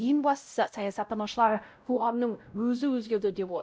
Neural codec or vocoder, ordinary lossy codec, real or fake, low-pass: codec, 16 kHz, 0.5 kbps, X-Codec, WavLM features, trained on Multilingual LibriSpeech; none; fake; none